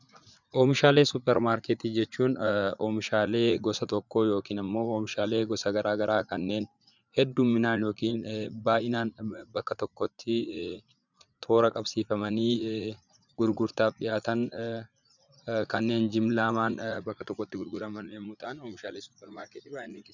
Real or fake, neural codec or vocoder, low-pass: fake; vocoder, 44.1 kHz, 80 mel bands, Vocos; 7.2 kHz